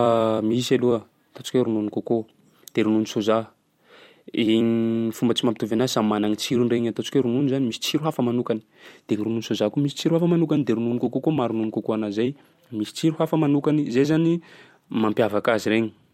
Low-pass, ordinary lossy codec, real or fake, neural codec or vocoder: 19.8 kHz; MP3, 64 kbps; fake; vocoder, 44.1 kHz, 128 mel bands every 256 samples, BigVGAN v2